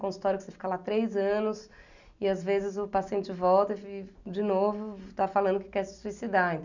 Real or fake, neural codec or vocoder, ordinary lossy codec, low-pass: real; none; none; 7.2 kHz